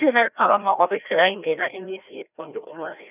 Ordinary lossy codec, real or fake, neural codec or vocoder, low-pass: none; fake; codec, 16 kHz, 1 kbps, FreqCodec, larger model; 3.6 kHz